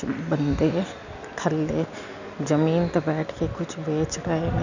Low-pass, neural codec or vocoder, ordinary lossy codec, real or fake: 7.2 kHz; none; none; real